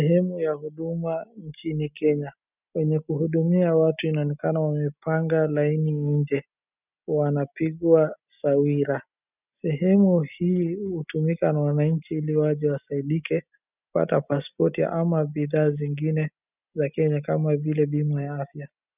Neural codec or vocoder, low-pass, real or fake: none; 3.6 kHz; real